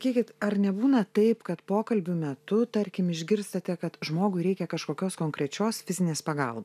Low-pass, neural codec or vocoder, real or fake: 14.4 kHz; none; real